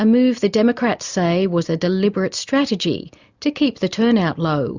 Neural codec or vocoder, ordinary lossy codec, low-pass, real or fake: none; Opus, 64 kbps; 7.2 kHz; real